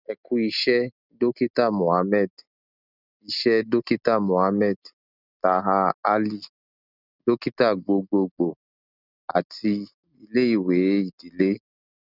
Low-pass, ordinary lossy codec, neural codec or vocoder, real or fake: 5.4 kHz; none; none; real